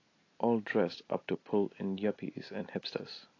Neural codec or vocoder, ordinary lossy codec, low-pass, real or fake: none; AAC, 32 kbps; 7.2 kHz; real